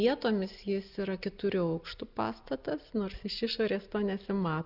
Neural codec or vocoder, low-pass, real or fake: none; 5.4 kHz; real